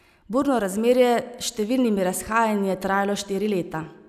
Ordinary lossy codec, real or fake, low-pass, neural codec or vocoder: none; real; 14.4 kHz; none